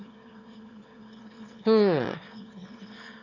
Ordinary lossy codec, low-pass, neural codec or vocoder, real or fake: none; 7.2 kHz; autoencoder, 22.05 kHz, a latent of 192 numbers a frame, VITS, trained on one speaker; fake